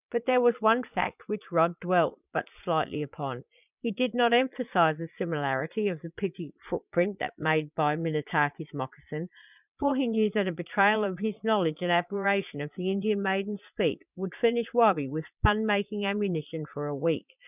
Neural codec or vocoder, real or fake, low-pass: vocoder, 22.05 kHz, 80 mel bands, Vocos; fake; 3.6 kHz